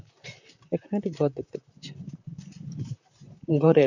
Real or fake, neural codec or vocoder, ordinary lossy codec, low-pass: fake; vocoder, 44.1 kHz, 128 mel bands, Pupu-Vocoder; none; 7.2 kHz